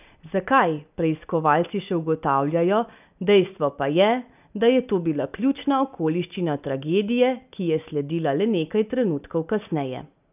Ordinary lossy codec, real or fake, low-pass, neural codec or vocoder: none; real; 3.6 kHz; none